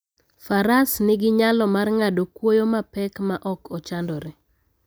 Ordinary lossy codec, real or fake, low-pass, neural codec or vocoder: none; real; none; none